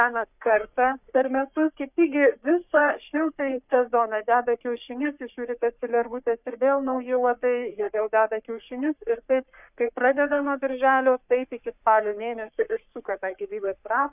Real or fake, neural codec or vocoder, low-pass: fake; codec, 44.1 kHz, 3.4 kbps, Pupu-Codec; 3.6 kHz